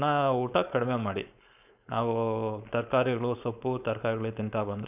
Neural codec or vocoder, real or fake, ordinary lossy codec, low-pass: codec, 16 kHz, 4.8 kbps, FACodec; fake; none; 3.6 kHz